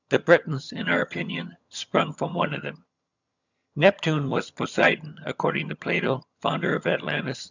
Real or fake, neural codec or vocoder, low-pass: fake; vocoder, 22.05 kHz, 80 mel bands, HiFi-GAN; 7.2 kHz